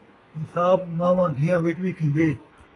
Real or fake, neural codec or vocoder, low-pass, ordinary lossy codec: fake; codec, 32 kHz, 1.9 kbps, SNAC; 10.8 kHz; AAC, 32 kbps